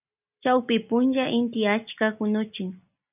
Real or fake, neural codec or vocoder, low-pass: real; none; 3.6 kHz